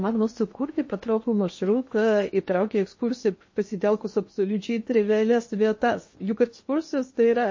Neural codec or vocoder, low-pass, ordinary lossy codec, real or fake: codec, 16 kHz in and 24 kHz out, 0.8 kbps, FocalCodec, streaming, 65536 codes; 7.2 kHz; MP3, 32 kbps; fake